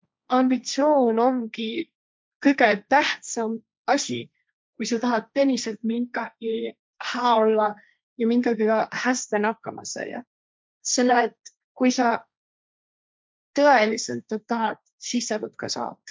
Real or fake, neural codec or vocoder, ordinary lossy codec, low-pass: fake; codec, 16 kHz, 1.1 kbps, Voila-Tokenizer; none; none